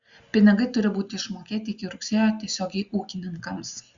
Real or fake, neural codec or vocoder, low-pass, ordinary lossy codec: real; none; 7.2 kHz; Opus, 64 kbps